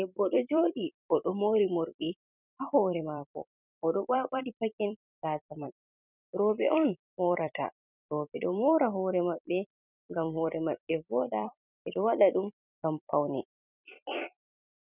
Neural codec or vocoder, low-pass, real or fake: vocoder, 44.1 kHz, 128 mel bands every 256 samples, BigVGAN v2; 3.6 kHz; fake